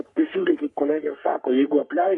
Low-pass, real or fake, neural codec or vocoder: 10.8 kHz; fake; codec, 44.1 kHz, 3.4 kbps, Pupu-Codec